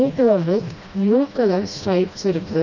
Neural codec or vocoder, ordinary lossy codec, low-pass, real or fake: codec, 16 kHz, 1 kbps, FreqCodec, smaller model; none; 7.2 kHz; fake